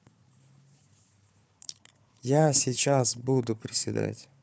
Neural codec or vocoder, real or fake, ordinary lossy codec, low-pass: codec, 16 kHz, 4 kbps, FunCodec, trained on Chinese and English, 50 frames a second; fake; none; none